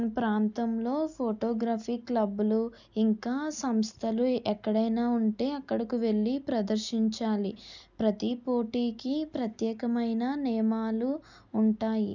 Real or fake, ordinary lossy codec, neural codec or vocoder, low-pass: real; none; none; 7.2 kHz